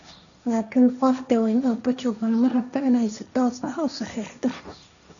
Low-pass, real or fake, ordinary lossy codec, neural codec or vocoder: 7.2 kHz; fake; none; codec, 16 kHz, 1.1 kbps, Voila-Tokenizer